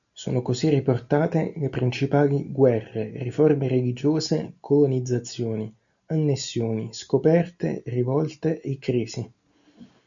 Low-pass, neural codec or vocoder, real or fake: 7.2 kHz; none; real